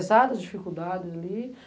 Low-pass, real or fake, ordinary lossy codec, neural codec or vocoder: none; real; none; none